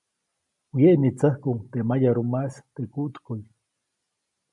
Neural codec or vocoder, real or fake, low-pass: none; real; 10.8 kHz